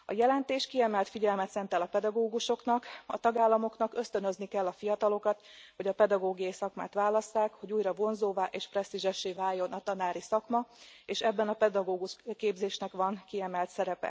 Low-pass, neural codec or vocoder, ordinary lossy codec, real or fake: none; none; none; real